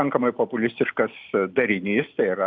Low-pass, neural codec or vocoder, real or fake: 7.2 kHz; none; real